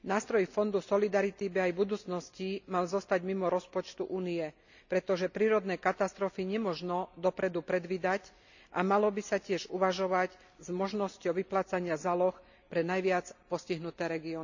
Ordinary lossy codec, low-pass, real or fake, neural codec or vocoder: none; 7.2 kHz; real; none